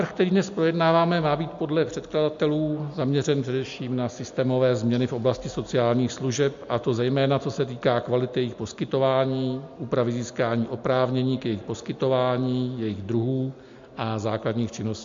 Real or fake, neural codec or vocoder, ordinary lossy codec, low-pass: real; none; MP3, 48 kbps; 7.2 kHz